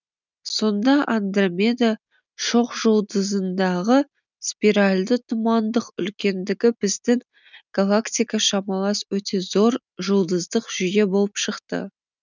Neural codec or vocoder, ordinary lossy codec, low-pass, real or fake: none; none; 7.2 kHz; real